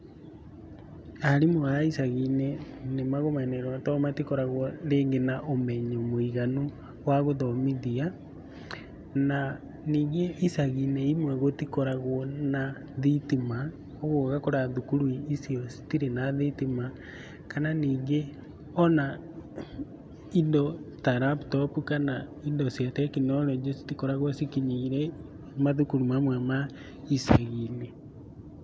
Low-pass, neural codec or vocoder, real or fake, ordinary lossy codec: none; none; real; none